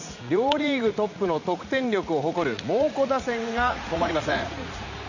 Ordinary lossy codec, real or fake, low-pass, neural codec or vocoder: none; fake; 7.2 kHz; vocoder, 44.1 kHz, 80 mel bands, Vocos